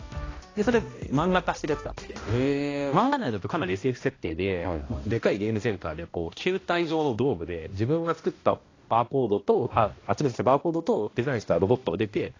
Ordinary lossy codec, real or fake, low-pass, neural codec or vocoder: AAC, 32 kbps; fake; 7.2 kHz; codec, 16 kHz, 1 kbps, X-Codec, HuBERT features, trained on balanced general audio